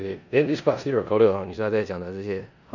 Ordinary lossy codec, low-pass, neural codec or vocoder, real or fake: none; 7.2 kHz; codec, 16 kHz in and 24 kHz out, 0.9 kbps, LongCat-Audio-Codec, four codebook decoder; fake